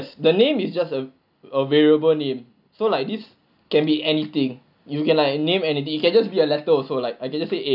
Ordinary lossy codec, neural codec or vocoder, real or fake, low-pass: none; none; real; 5.4 kHz